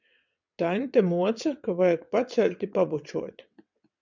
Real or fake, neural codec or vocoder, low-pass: fake; vocoder, 22.05 kHz, 80 mel bands, WaveNeXt; 7.2 kHz